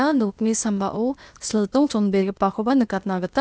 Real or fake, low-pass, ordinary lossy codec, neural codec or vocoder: fake; none; none; codec, 16 kHz, 0.8 kbps, ZipCodec